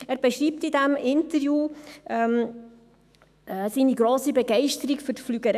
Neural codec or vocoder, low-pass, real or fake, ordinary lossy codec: autoencoder, 48 kHz, 128 numbers a frame, DAC-VAE, trained on Japanese speech; 14.4 kHz; fake; none